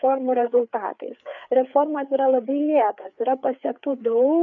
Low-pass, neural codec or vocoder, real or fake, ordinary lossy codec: 7.2 kHz; codec, 16 kHz, 4 kbps, FreqCodec, larger model; fake; MP3, 96 kbps